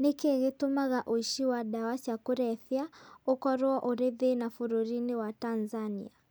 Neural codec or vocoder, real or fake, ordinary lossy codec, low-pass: none; real; none; none